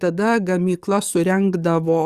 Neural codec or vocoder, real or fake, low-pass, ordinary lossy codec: codec, 44.1 kHz, 7.8 kbps, DAC; fake; 14.4 kHz; Opus, 64 kbps